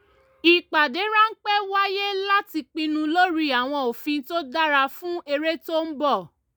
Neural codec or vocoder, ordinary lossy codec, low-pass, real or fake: none; none; none; real